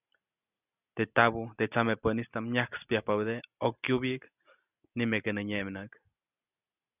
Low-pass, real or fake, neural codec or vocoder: 3.6 kHz; real; none